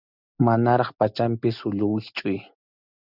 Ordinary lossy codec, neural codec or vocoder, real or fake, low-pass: Opus, 64 kbps; none; real; 5.4 kHz